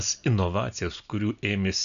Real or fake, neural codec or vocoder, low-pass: real; none; 7.2 kHz